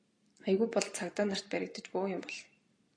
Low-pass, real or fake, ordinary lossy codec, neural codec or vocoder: 9.9 kHz; real; AAC, 48 kbps; none